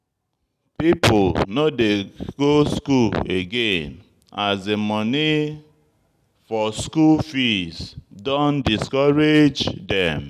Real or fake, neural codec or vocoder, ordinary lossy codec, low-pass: real; none; none; 14.4 kHz